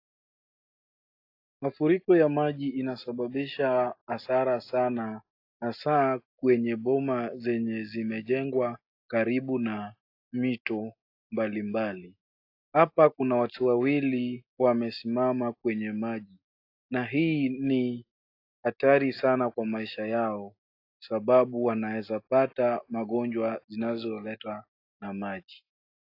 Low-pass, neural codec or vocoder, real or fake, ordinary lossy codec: 5.4 kHz; none; real; AAC, 32 kbps